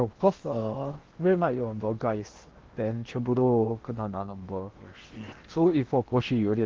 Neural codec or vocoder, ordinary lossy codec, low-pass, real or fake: codec, 16 kHz in and 24 kHz out, 0.8 kbps, FocalCodec, streaming, 65536 codes; Opus, 16 kbps; 7.2 kHz; fake